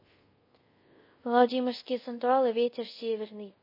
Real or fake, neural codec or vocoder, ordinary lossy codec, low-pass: fake; codec, 24 kHz, 0.5 kbps, DualCodec; MP3, 24 kbps; 5.4 kHz